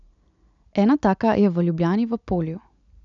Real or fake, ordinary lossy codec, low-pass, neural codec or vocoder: real; none; 7.2 kHz; none